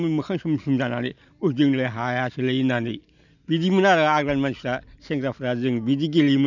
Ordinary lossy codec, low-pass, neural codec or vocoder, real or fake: none; 7.2 kHz; none; real